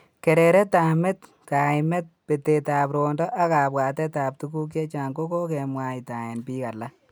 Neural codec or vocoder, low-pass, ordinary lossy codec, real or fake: none; none; none; real